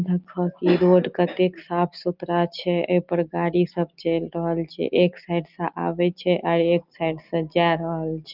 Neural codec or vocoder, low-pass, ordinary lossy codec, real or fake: none; 5.4 kHz; Opus, 32 kbps; real